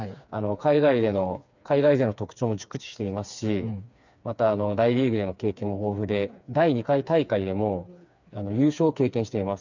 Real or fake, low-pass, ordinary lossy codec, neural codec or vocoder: fake; 7.2 kHz; none; codec, 16 kHz, 4 kbps, FreqCodec, smaller model